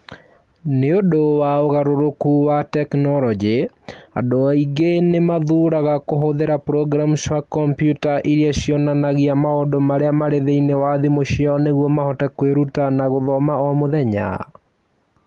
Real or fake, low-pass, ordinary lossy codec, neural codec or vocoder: real; 10.8 kHz; Opus, 24 kbps; none